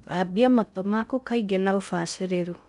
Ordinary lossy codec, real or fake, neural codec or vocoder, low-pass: none; fake; codec, 16 kHz in and 24 kHz out, 0.6 kbps, FocalCodec, streaming, 2048 codes; 10.8 kHz